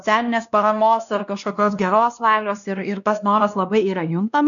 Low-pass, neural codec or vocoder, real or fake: 7.2 kHz; codec, 16 kHz, 1 kbps, X-Codec, WavLM features, trained on Multilingual LibriSpeech; fake